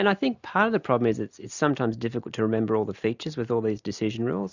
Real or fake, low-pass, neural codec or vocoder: real; 7.2 kHz; none